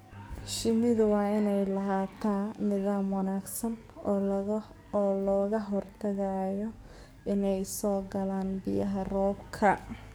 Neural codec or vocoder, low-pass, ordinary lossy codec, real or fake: codec, 44.1 kHz, 7.8 kbps, DAC; none; none; fake